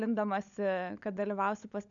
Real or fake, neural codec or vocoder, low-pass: fake; codec, 16 kHz, 16 kbps, FunCodec, trained on LibriTTS, 50 frames a second; 7.2 kHz